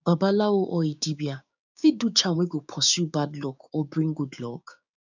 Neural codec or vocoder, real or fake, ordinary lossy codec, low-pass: codec, 16 kHz, 6 kbps, DAC; fake; none; 7.2 kHz